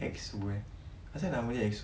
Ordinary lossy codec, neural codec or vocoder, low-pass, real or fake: none; none; none; real